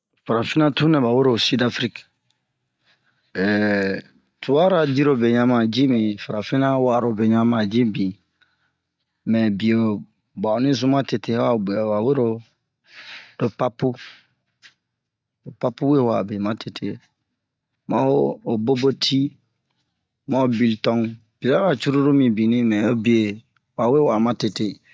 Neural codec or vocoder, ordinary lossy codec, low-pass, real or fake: none; none; none; real